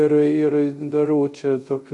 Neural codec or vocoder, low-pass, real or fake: codec, 24 kHz, 0.5 kbps, DualCodec; 10.8 kHz; fake